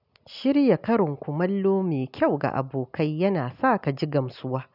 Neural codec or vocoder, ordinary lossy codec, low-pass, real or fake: none; none; 5.4 kHz; real